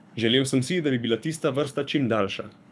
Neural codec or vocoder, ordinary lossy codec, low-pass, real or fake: codec, 24 kHz, 6 kbps, HILCodec; none; none; fake